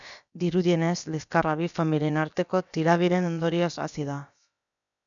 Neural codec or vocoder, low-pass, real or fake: codec, 16 kHz, about 1 kbps, DyCAST, with the encoder's durations; 7.2 kHz; fake